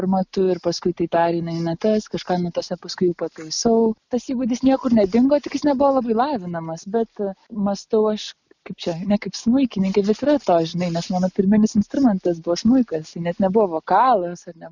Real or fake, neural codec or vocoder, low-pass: real; none; 7.2 kHz